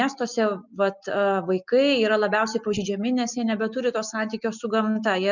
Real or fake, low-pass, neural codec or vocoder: real; 7.2 kHz; none